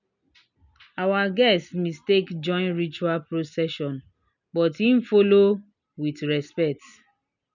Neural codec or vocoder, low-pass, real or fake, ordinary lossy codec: none; 7.2 kHz; real; none